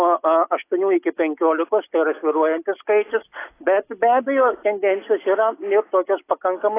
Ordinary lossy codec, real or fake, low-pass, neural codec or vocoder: AAC, 24 kbps; real; 3.6 kHz; none